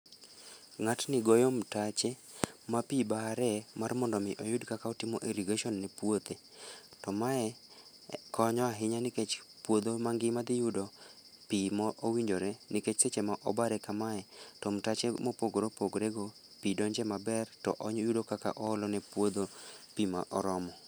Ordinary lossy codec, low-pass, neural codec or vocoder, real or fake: none; none; none; real